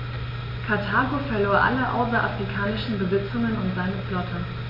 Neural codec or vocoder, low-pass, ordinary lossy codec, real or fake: none; 5.4 kHz; MP3, 32 kbps; real